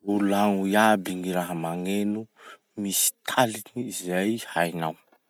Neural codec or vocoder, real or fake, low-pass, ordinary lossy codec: none; real; none; none